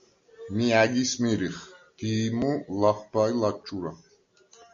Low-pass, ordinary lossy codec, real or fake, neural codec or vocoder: 7.2 kHz; MP3, 48 kbps; real; none